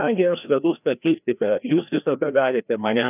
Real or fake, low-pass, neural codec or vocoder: fake; 3.6 kHz; codec, 16 kHz, 1 kbps, FreqCodec, larger model